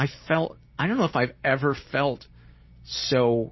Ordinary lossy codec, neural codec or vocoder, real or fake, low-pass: MP3, 24 kbps; none; real; 7.2 kHz